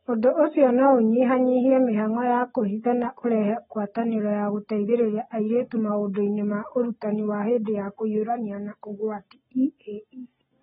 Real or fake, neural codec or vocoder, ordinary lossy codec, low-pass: real; none; AAC, 16 kbps; 14.4 kHz